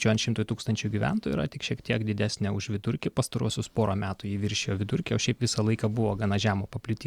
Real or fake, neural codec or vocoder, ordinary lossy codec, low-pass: real; none; Opus, 64 kbps; 19.8 kHz